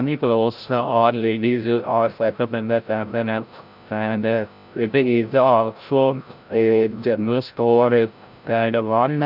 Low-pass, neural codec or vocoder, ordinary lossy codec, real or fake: 5.4 kHz; codec, 16 kHz, 0.5 kbps, FreqCodec, larger model; none; fake